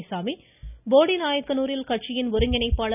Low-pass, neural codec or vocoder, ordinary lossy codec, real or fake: 3.6 kHz; none; none; real